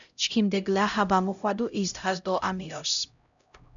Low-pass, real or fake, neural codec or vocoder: 7.2 kHz; fake; codec, 16 kHz, 0.5 kbps, X-Codec, HuBERT features, trained on LibriSpeech